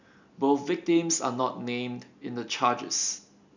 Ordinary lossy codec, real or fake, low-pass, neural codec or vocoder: none; real; 7.2 kHz; none